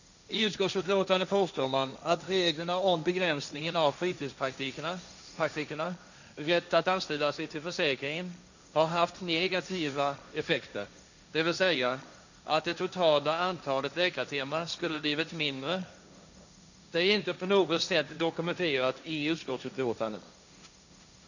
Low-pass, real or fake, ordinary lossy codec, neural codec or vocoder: 7.2 kHz; fake; none; codec, 16 kHz, 1.1 kbps, Voila-Tokenizer